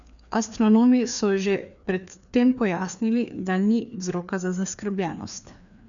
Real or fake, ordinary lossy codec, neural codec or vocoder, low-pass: fake; none; codec, 16 kHz, 2 kbps, FreqCodec, larger model; 7.2 kHz